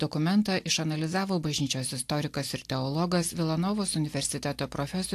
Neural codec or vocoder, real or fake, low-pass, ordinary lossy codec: none; real; 14.4 kHz; AAC, 64 kbps